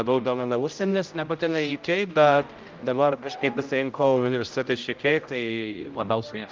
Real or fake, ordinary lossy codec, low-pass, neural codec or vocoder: fake; Opus, 24 kbps; 7.2 kHz; codec, 16 kHz, 0.5 kbps, X-Codec, HuBERT features, trained on general audio